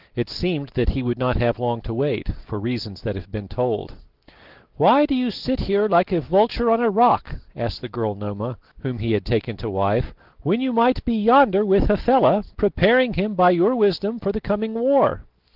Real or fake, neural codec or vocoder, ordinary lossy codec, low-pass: real; none; Opus, 16 kbps; 5.4 kHz